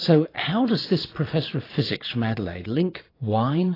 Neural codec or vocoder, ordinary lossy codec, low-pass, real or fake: vocoder, 22.05 kHz, 80 mel bands, Vocos; AAC, 24 kbps; 5.4 kHz; fake